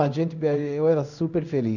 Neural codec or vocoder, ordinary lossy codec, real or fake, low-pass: codec, 16 kHz in and 24 kHz out, 1 kbps, XY-Tokenizer; none; fake; 7.2 kHz